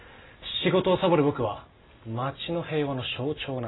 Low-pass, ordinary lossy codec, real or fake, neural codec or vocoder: 7.2 kHz; AAC, 16 kbps; real; none